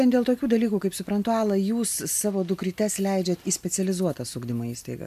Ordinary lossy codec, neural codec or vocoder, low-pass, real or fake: MP3, 96 kbps; none; 14.4 kHz; real